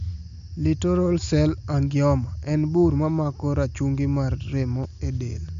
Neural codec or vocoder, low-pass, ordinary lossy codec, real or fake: none; 7.2 kHz; MP3, 64 kbps; real